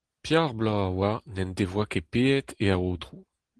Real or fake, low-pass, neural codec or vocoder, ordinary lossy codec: real; 10.8 kHz; none; Opus, 16 kbps